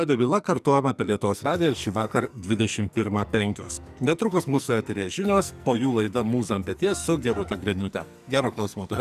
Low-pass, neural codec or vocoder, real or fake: 14.4 kHz; codec, 44.1 kHz, 2.6 kbps, SNAC; fake